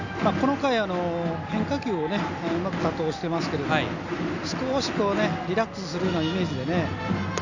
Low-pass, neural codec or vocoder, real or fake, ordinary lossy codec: 7.2 kHz; none; real; none